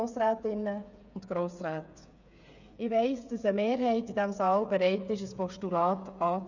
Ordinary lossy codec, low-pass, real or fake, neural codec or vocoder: none; 7.2 kHz; fake; codec, 16 kHz, 8 kbps, FreqCodec, smaller model